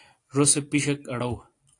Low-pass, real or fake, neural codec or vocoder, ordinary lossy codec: 10.8 kHz; real; none; AAC, 48 kbps